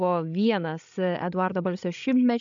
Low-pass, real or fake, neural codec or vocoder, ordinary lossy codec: 7.2 kHz; fake; codec, 16 kHz, 16 kbps, FreqCodec, larger model; AAC, 64 kbps